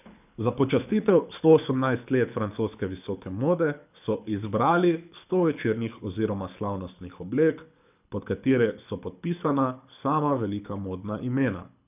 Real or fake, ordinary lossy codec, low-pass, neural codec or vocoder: fake; none; 3.6 kHz; codec, 24 kHz, 6 kbps, HILCodec